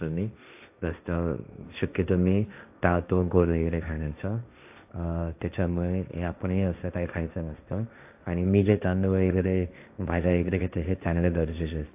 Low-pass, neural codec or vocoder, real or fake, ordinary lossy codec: 3.6 kHz; codec, 16 kHz, 1.1 kbps, Voila-Tokenizer; fake; AAC, 32 kbps